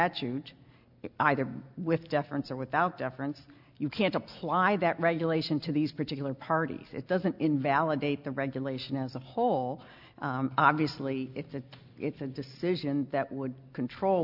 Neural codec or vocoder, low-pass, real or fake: none; 5.4 kHz; real